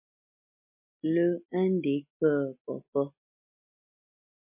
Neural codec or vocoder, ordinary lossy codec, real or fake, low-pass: none; MP3, 24 kbps; real; 3.6 kHz